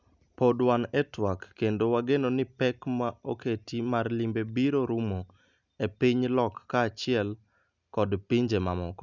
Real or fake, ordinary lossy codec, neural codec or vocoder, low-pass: real; none; none; 7.2 kHz